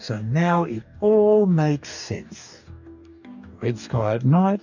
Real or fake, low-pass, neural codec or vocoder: fake; 7.2 kHz; codec, 44.1 kHz, 2.6 kbps, DAC